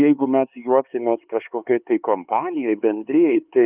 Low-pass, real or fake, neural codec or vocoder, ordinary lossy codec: 3.6 kHz; fake; codec, 16 kHz, 4 kbps, X-Codec, HuBERT features, trained on LibriSpeech; Opus, 24 kbps